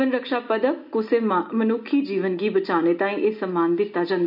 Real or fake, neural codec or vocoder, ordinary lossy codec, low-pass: real; none; none; 5.4 kHz